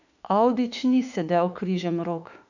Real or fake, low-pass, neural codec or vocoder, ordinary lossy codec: fake; 7.2 kHz; autoencoder, 48 kHz, 32 numbers a frame, DAC-VAE, trained on Japanese speech; none